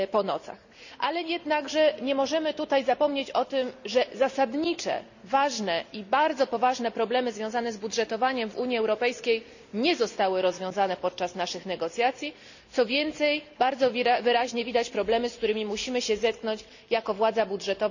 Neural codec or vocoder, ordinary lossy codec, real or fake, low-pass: none; none; real; 7.2 kHz